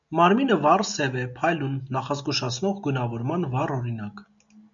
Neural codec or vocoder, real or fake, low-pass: none; real; 7.2 kHz